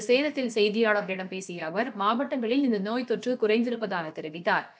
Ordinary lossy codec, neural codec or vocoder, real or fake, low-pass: none; codec, 16 kHz, about 1 kbps, DyCAST, with the encoder's durations; fake; none